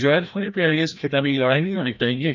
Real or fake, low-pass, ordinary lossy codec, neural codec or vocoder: fake; 7.2 kHz; none; codec, 16 kHz, 1 kbps, FreqCodec, larger model